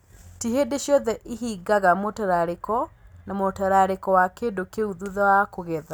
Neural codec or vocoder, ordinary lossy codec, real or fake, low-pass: none; none; real; none